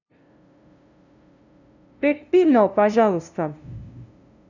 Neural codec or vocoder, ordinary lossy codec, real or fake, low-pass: codec, 16 kHz, 0.5 kbps, FunCodec, trained on LibriTTS, 25 frames a second; none; fake; 7.2 kHz